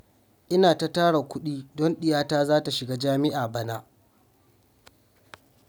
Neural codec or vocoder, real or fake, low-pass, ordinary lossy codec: none; real; none; none